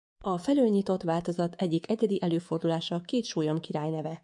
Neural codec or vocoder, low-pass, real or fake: codec, 24 kHz, 3.1 kbps, DualCodec; 10.8 kHz; fake